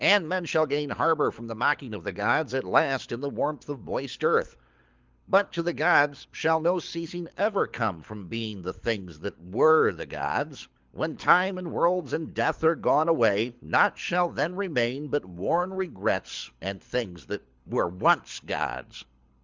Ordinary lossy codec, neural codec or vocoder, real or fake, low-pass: Opus, 32 kbps; codec, 24 kHz, 6 kbps, HILCodec; fake; 7.2 kHz